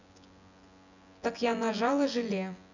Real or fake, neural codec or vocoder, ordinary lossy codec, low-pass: fake; vocoder, 24 kHz, 100 mel bands, Vocos; none; 7.2 kHz